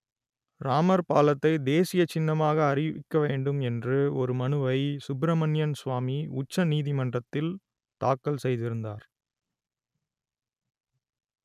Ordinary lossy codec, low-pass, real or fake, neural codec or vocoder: none; 14.4 kHz; real; none